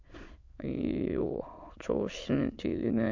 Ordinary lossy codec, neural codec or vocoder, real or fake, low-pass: MP3, 48 kbps; autoencoder, 22.05 kHz, a latent of 192 numbers a frame, VITS, trained on many speakers; fake; 7.2 kHz